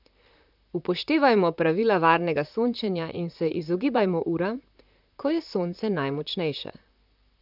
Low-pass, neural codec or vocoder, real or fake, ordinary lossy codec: 5.4 kHz; vocoder, 44.1 kHz, 128 mel bands, Pupu-Vocoder; fake; none